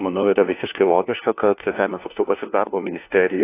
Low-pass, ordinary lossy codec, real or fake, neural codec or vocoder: 3.6 kHz; AAC, 24 kbps; fake; codec, 16 kHz, 1 kbps, FunCodec, trained on LibriTTS, 50 frames a second